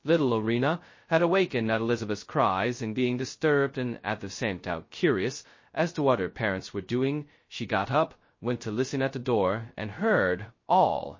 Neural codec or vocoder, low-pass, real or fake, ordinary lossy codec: codec, 16 kHz, 0.2 kbps, FocalCodec; 7.2 kHz; fake; MP3, 32 kbps